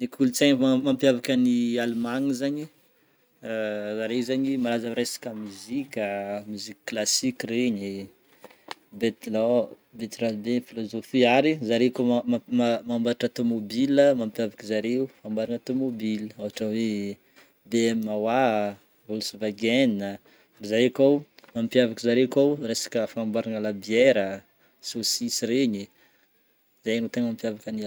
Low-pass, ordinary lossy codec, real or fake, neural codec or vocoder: none; none; real; none